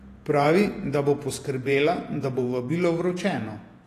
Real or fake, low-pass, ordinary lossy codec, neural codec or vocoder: fake; 14.4 kHz; AAC, 48 kbps; vocoder, 48 kHz, 128 mel bands, Vocos